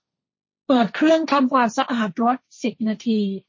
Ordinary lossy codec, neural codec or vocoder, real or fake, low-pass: MP3, 32 kbps; codec, 16 kHz, 1.1 kbps, Voila-Tokenizer; fake; 7.2 kHz